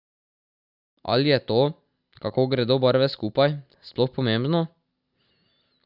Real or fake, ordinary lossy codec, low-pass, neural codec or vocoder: real; Opus, 64 kbps; 5.4 kHz; none